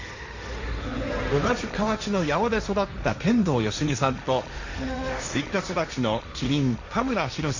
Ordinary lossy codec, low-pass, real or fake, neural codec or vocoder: Opus, 64 kbps; 7.2 kHz; fake; codec, 16 kHz, 1.1 kbps, Voila-Tokenizer